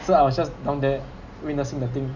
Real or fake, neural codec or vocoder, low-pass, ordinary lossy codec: real; none; 7.2 kHz; none